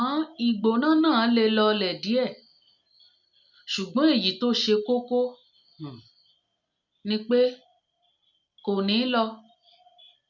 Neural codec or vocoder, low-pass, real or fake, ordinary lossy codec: none; 7.2 kHz; real; none